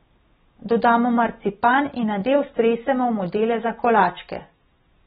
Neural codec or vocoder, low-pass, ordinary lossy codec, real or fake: none; 7.2 kHz; AAC, 16 kbps; real